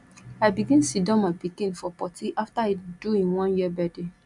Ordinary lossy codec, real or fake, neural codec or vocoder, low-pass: MP3, 96 kbps; real; none; 10.8 kHz